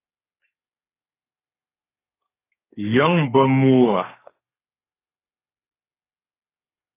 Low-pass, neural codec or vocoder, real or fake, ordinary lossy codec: 3.6 kHz; codec, 32 kHz, 1.9 kbps, SNAC; fake; AAC, 24 kbps